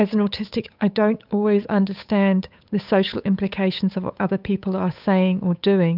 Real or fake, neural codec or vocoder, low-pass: fake; codec, 16 kHz, 8 kbps, FunCodec, trained on Chinese and English, 25 frames a second; 5.4 kHz